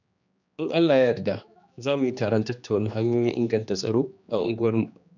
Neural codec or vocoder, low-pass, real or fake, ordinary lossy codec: codec, 16 kHz, 2 kbps, X-Codec, HuBERT features, trained on general audio; 7.2 kHz; fake; none